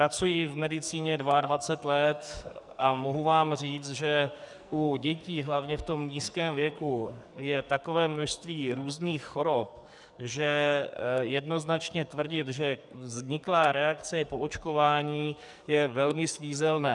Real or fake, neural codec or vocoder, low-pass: fake; codec, 44.1 kHz, 2.6 kbps, SNAC; 10.8 kHz